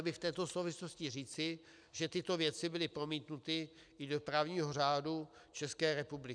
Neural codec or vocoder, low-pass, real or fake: none; 9.9 kHz; real